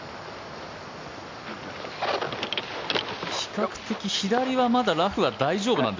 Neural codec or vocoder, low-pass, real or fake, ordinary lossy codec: none; 7.2 kHz; real; MP3, 64 kbps